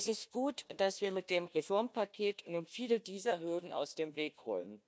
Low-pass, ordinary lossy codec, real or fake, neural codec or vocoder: none; none; fake; codec, 16 kHz, 1 kbps, FunCodec, trained on Chinese and English, 50 frames a second